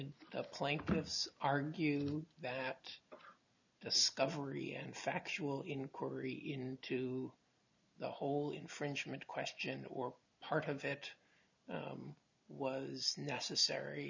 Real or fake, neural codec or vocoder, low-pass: real; none; 7.2 kHz